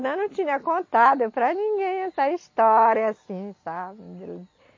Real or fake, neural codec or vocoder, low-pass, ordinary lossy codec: real; none; 7.2 kHz; MP3, 32 kbps